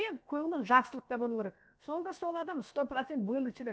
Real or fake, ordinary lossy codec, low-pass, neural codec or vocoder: fake; none; none; codec, 16 kHz, about 1 kbps, DyCAST, with the encoder's durations